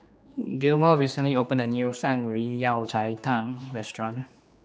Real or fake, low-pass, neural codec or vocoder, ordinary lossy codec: fake; none; codec, 16 kHz, 2 kbps, X-Codec, HuBERT features, trained on general audio; none